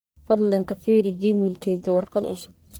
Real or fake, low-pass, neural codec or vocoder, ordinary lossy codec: fake; none; codec, 44.1 kHz, 1.7 kbps, Pupu-Codec; none